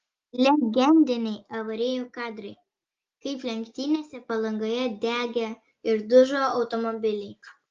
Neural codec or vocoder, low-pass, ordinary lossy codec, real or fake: none; 7.2 kHz; Opus, 32 kbps; real